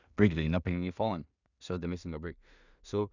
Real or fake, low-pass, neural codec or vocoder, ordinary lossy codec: fake; 7.2 kHz; codec, 16 kHz in and 24 kHz out, 0.4 kbps, LongCat-Audio-Codec, two codebook decoder; none